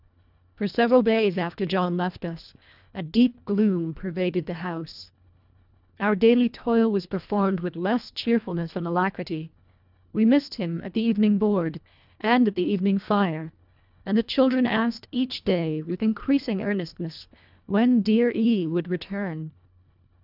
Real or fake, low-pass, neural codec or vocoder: fake; 5.4 kHz; codec, 24 kHz, 1.5 kbps, HILCodec